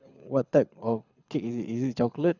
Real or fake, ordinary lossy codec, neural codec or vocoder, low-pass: fake; none; codec, 24 kHz, 6 kbps, HILCodec; 7.2 kHz